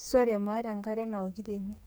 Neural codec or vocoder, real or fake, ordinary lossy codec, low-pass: codec, 44.1 kHz, 2.6 kbps, SNAC; fake; none; none